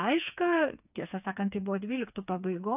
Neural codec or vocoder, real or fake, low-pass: codec, 16 kHz, 4 kbps, FreqCodec, smaller model; fake; 3.6 kHz